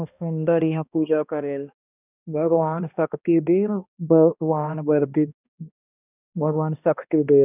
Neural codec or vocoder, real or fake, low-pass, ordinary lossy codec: codec, 16 kHz, 1 kbps, X-Codec, HuBERT features, trained on balanced general audio; fake; 3.6 kHz; none